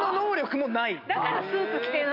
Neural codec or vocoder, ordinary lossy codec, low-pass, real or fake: none; none; 5.4 kHz; real